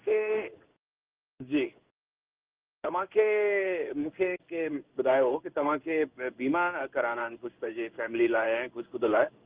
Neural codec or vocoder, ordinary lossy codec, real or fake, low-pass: codec, 16 kHz in and 24 kHz out, 1 kbps, XY-Tokenizer; Opus, 32 kbps; fake; 3.6 kHz